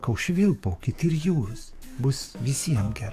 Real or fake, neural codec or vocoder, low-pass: fake; codec, 44.1 kHz, 7.8 kbps, DAC; 14.4 kHz